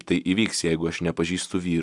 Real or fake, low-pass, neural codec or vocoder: real; 10.8 kHz; none